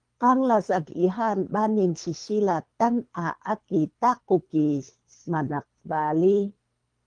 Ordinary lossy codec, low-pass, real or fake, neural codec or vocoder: Opus, 32 kbps; 9.9 kHz; fake; codec, 24 kHz, 3 kbps, HILCodec